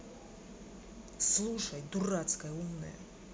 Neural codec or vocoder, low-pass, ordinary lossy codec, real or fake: none; none; none; real